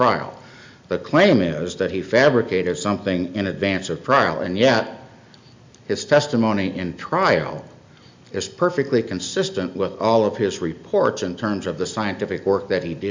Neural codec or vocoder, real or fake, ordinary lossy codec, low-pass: none; real; MP3, 64 kbps; 7.2 kHz